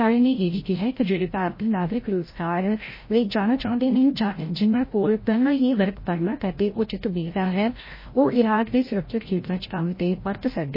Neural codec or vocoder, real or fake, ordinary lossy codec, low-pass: codec, 16 kHz, 0.5 kbps, FreqCodec, larger model; fake; MP3, 24 kbps; 5.4 kHz